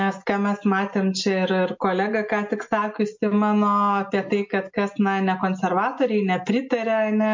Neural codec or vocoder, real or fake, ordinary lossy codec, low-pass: none; real; MP3, 48 kbps; 7.2 kHz